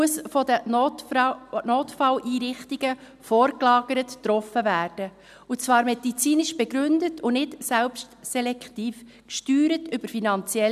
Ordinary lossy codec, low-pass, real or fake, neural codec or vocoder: none; 14.4 kHz; real; none